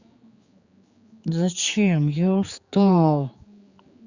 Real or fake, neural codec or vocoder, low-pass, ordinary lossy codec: fake; codec, 16 kHz, 4 kbps, X-Codec, HuBERT features, trained on general audio; 7.2 kHz; Opus, 64 kbps